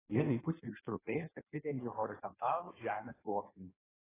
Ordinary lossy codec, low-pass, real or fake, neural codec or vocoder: AAC, 16 kbps; 3.6 kHz; fake; codec, 16 kHz in and 24 kHz out, 1.1 kbps, FireRedTTS-2 codec